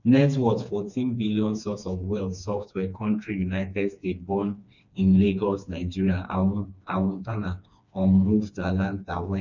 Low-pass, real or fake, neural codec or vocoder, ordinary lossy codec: 7.2 kHz; fake; codec, 16 kHz, 2 kbps, FreqCodec, smaller model; none